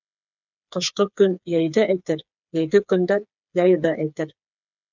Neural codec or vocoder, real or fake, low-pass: codec, 16 kHz, 4 kbps, FreqCodec, smaller model; fake; 7.2 kHz